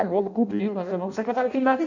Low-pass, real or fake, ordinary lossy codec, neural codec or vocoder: 7.2 kHz; fake; none; codec, 16 kHz in and 24 kHz out, 0.6 kbps, FireRedTTS-2 codec